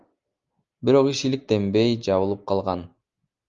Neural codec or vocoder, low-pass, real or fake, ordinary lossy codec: none; 7.2 kHz; real; Opus, 32 kbps